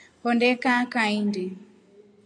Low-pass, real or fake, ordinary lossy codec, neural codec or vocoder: 9.9 kHz; real; AAC, 64 kbps; none